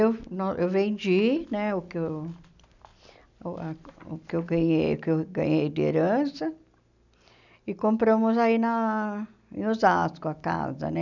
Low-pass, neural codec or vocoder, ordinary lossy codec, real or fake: 7.2 kHz; none; none; real